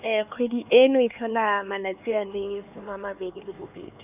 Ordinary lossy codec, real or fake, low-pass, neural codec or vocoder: none; fake; 3.6 kHz; codec, 16 kHz, 4 kbps, X-Codec, HuBERT features, trained on LibriSpeech